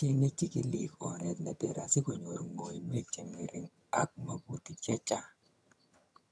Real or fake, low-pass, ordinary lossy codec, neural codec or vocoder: fake; none; none; vocoder, 22.05 kHz, 80 mel bands, HiFi-GAN